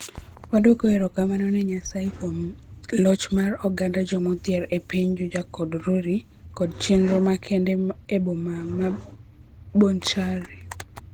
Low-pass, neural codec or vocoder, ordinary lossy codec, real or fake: 19.8 kHz; none; Opus, 16 kbps; real